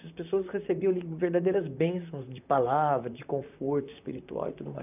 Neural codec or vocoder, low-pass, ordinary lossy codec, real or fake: vocoder, 44.1 kHz, 128 mel bands, Pupu-Vocoder; 3.6 kHz; none; fake